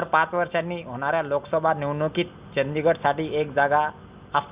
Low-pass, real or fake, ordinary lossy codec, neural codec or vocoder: 3.6 kHz; real; Opus, 64 kbps; none